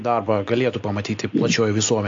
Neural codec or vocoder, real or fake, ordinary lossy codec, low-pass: none; real; AAC, 48 kbps; 7.2 kHz